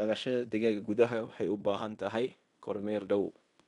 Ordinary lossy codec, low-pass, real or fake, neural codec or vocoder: none; 10.8 kHz; fake; codec, 16 kHz in and 24 kHz out, 0.9 kbps, LongCat-Audio-Codec, fine tuned four codebook decoder